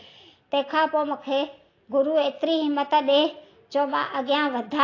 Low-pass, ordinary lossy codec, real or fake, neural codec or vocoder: 7.2 kHz; AAC, 32 kbps; real; none